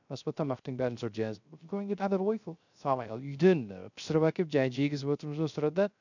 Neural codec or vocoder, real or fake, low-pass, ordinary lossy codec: codec, 16 kHz, 0.3 kbps, FocalCodec; fake; 7.2 kHz; none